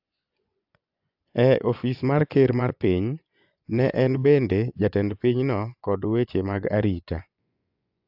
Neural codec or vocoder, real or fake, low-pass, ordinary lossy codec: vocoder, 24 kHz, 100 mel bands, Vocos; fake; 5.4 kHz; AAC, 48 kbps